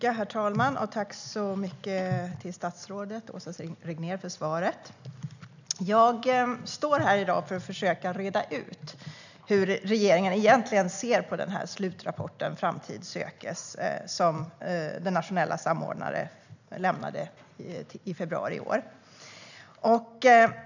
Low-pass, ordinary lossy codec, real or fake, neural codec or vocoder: 7.2 kHz; none; real; none